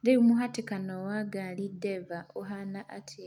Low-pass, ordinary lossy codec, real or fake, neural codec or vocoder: 19.8 kHz; none; real; none